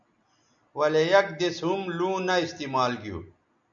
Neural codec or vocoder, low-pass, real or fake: none; 7.2 kHz; real